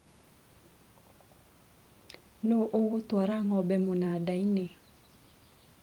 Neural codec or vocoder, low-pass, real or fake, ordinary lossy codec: vocoder, 44.1 kHz, 128 mel bands every 512 samples, BigVGAN v2; 19.8 kHz; fake; Opus, 32 kbps